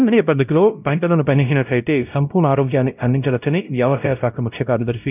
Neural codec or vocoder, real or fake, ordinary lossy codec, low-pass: codec, 16 kHz, 0.5 kbps, X-Codec, WavLM features, trained on Multilingual LibriSpeech; fake; none; 3.6 kHz